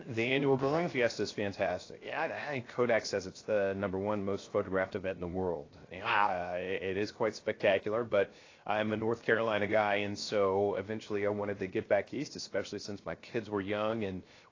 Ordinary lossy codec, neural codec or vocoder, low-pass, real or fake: AAC, 32 kbps; codec, 16 kHz, 0.7 kbps, FocalCodec; 7.2 kHz; fake